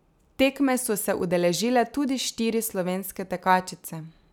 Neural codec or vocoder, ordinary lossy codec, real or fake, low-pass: none; none; real; 19.8 kHz